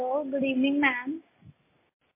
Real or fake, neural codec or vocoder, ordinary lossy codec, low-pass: real; none; MP3, 16 kbps; 3.6 kHz